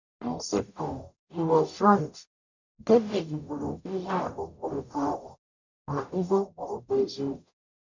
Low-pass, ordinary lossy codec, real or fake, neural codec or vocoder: 7.2 kHz; none; fake; codec, 44.1 kHz, 0.9 kbps, DAC